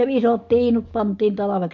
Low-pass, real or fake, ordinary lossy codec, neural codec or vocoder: 7.2 kHz; real; MP3, 48 kbps; none